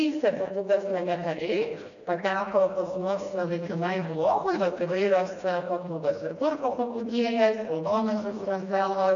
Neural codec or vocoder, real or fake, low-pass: codec, 16 kHz, 1 kbps, FreqCodec, smaller model; fake; 7.2 kHz